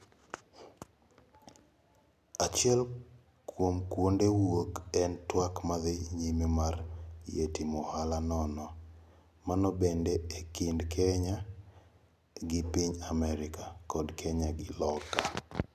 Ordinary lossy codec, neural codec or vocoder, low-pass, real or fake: none; none; 14.4 kHz; real